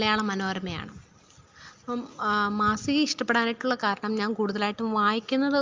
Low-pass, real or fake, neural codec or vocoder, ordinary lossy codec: 7.2 kHz; real; none; Opus, 24 kbps